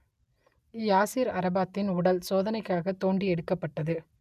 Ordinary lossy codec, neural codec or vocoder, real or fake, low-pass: none; none; real; 14.4 kHz